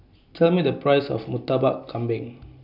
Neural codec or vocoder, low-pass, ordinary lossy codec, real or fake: none; 5.4 kHz; none; real